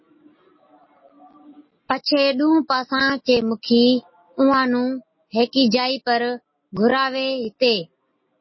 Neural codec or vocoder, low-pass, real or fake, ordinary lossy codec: none; 7.2 kHz; real; MP3, 24 kbps